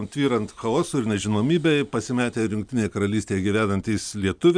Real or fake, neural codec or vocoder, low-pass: real; none; 9.9 kHz